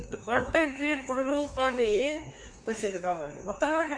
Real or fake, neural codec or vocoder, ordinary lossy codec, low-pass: fake; codec, 24 kHz, 1 kbps, SNAC; MP3, 64 kbps; 9.9 kHz